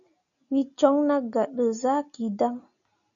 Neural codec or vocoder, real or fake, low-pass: none; real; 7.2 kHz